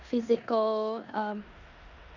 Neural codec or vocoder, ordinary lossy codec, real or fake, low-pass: codec, 16 kHz in and 24 kHz out, 0.9 kbps, LongCat-Audio-Codec, four codebook decoder; none; fake; 7.2 kHz